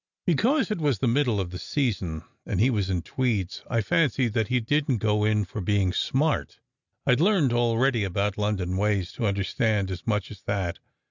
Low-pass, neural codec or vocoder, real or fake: 7.2 kHz; none; real